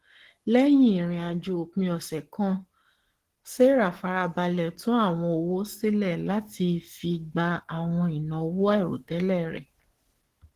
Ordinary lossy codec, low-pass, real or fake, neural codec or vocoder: Opus, 16 kbps; 14.4 kHz; fake; codec, 44.1 kHz, 7.8 kbps, Pupu-Codec